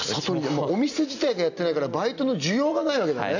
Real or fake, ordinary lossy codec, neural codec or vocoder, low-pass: real; none; none; 7.2 kHz